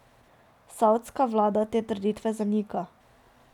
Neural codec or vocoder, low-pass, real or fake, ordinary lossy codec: none; 19.8 kHz; real; none